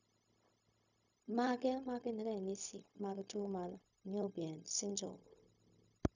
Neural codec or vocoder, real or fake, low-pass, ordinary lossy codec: codec, 16 kHz, 0.4 kbps, LongCat-Audio-Codec; fake; 7.2 kHz; none